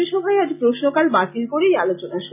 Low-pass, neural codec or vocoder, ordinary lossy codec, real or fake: 3.6 kHz; none; none; real